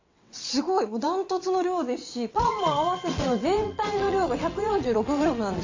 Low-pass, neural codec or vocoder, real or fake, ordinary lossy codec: 7.2 kHz; vocoder, 22.05 kHz, 80 mel bands, WaveNeXt; fake; AAC, 48 kbps